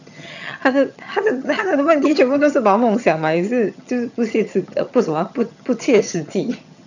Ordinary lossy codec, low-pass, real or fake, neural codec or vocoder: none; 7.2 kHz; fake; vocoder, 22.05 kHz, 80 mel bands, HiFi-GAN